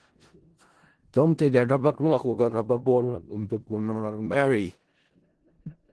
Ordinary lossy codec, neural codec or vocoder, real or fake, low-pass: Opus, 16 kbps; codec, 16 kHz in and 24 kHz out, 0.4 kbps, LongCat-Audio-Codec, four codebook decoder; fake; 10.8 kHz